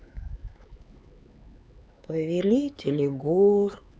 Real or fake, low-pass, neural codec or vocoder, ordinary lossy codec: fake; none; codec, 16 kHz, 4 kbps, X-Codec, HuBERT features, trained on LibriSpeech; none